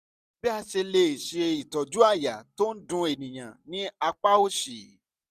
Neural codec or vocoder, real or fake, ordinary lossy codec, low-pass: none; real; none; 14.4 kHz